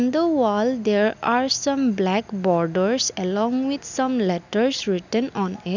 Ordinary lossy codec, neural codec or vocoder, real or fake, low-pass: none; none; real; 7.2 kHz